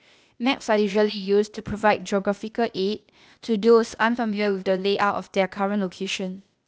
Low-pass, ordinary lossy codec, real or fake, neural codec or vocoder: none; none; fake; codec, 16 kHz, 0.8 kbps, ZipCodec